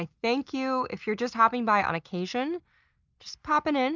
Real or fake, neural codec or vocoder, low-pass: real; none; 7.2 kHz